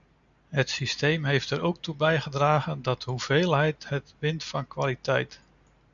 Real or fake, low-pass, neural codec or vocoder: real; 7.2 kHz; none